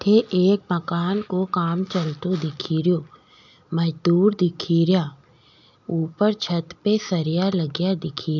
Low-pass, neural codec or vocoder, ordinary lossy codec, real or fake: 7.2 kHz; none; none; real